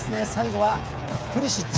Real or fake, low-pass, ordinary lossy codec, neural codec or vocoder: fake; none; none; codec, 16 kHz, 8 kbps, FreqCodec, smaller model